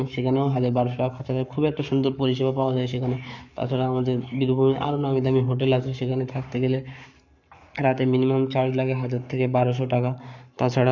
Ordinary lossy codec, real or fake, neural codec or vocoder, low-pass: none; fake; codec, 44.1 kHz, 7.8 kbps, Pupu-Codec; 7.2 kHz